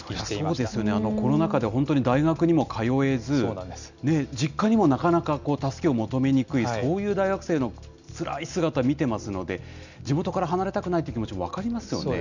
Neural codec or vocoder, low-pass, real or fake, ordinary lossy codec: none; 7.2 kHz; real; none